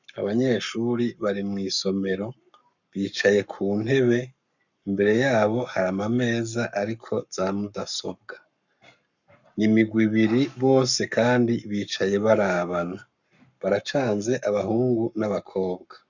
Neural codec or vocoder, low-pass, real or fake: codec, 44.1 kHz, 7.8 kbps, Pupu-Codec; 7.2 kHz; fake